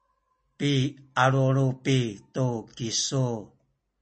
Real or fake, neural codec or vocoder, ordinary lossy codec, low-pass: real; none; MP3, 32 kbps; 10.8 kHz